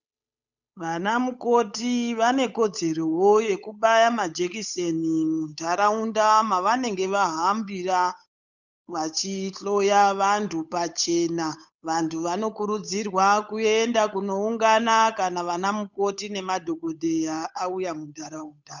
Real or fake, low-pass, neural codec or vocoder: fake; 7.2 kHz; codec, 16 kHz, 8 kbps, FunCodec, trained on Chinese and English, 25 frames a second